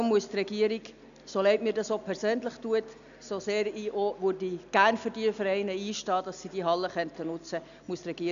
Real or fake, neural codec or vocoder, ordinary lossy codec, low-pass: real; none; none; 7.2 kHz